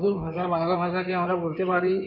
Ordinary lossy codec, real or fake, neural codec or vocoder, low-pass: AAC, 48 kbps; fake; codec, 16 kHz in and 24 kHz out, 2.2 kbps, FireRedTTS-2 codec; 5.4 kHz